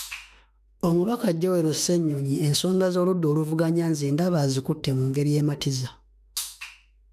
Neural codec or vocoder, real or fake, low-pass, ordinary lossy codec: autoencoder, 48 kHz, 32 numbers a frame, DAC-VAE, trained on Japanese speech; fake; 14.4 kHz; none